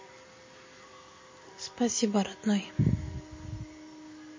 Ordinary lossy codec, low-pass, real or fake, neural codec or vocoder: MP3, 32 kbps; 7.2 kHz; real; none